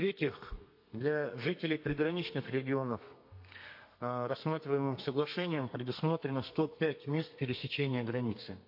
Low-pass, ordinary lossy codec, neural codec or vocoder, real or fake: 5.4 kHz; MP3, 32 kbps; codec, 32 kHz, 1.9 kbps, SNAC; fake